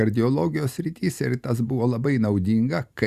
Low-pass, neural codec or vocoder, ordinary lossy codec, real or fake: 14.4 kHz; none; Opus, 64 kbps; real